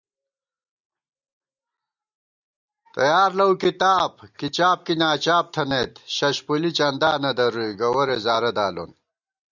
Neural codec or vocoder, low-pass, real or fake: none; 7.2 kHz; real